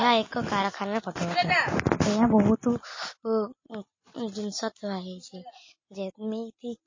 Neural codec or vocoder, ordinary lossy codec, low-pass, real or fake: none; MP3, 32 kbps; 7.2 kHz; real